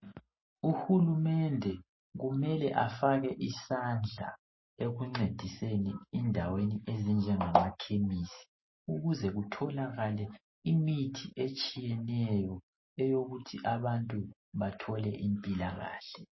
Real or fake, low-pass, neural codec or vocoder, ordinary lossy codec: real; 7.2 kHz; none; MP3, 24 kbps